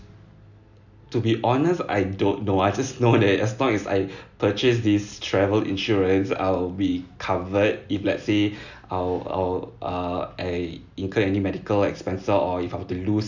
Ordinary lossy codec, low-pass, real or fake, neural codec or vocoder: none; 7.2 kHz; real; none